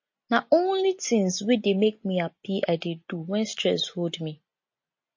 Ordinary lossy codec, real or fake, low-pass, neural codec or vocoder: MP3, 32 kbps; real; 7.2 kHz; none